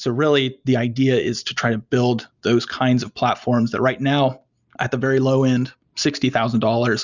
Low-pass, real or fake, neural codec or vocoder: 7.2 kHz; real; none